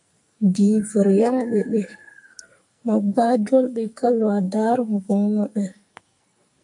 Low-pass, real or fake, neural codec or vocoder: 10.8 kHz; fake; codec, 44.1 kHz, 2.6 kbps, SNAC